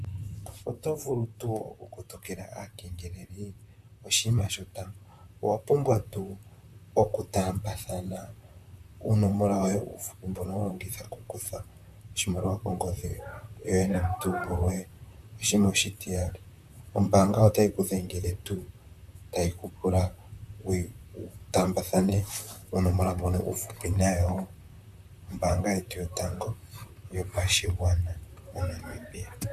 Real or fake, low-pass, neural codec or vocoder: fake; 14.4 kHz; vocoder, 44.1 kHz, 128 mel bands, Pupu-Vocoder